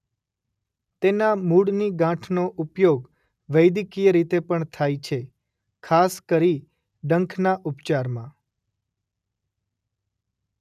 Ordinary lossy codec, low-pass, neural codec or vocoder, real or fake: none; 14.4 kHz; none; real